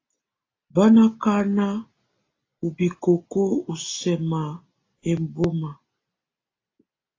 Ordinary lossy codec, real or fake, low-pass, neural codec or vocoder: AAC, 32 kbps; real; 7.2 kHz; none